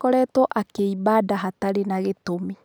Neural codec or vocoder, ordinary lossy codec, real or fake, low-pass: none; none; real; none